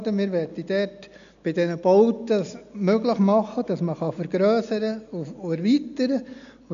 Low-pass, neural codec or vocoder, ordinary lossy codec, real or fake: 7.2 kHz; none; none; real